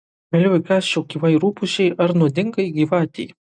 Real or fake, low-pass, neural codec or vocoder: real; 9.9 kHz; none